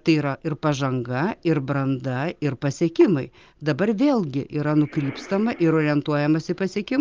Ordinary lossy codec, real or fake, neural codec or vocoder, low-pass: Opus, 24 kbps; real; none; 7.2 kHz